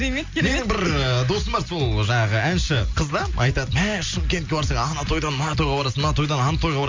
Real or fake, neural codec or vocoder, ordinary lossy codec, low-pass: real; none; MP3, 48 kbps; 7.2 kHz